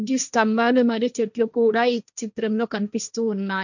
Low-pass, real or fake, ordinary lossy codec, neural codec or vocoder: 7.2 kHz; fake; none; codec, 16 kHz, 1.1 kbps, Voila-Tokenizer